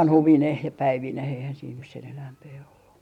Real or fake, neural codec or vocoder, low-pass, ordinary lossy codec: real; none; 19.8 kHz; none